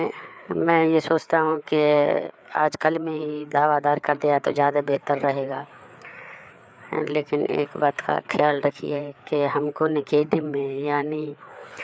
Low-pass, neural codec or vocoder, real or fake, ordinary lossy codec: none; codec, 16 kHz, 4 kbps, FreqCodec, larger model; fake; none